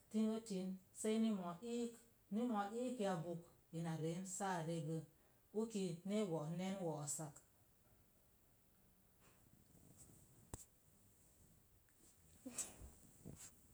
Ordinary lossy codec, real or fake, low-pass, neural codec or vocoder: none; real; none; none